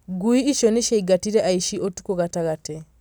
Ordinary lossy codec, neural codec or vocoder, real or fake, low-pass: none; none; real; none